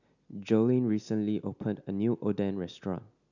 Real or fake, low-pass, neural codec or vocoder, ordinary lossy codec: real; 7.2 kHz; none; none